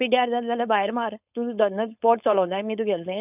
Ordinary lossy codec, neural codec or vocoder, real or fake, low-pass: none; codec, 16 kHz, 4.8 kbps, FACodec; fake; 3.6 kHz